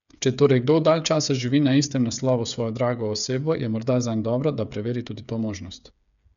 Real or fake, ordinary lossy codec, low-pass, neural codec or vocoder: fake; none; 7.2 kHz; codec, 16 kHz, 8 kbps, FreqCodec, smaller model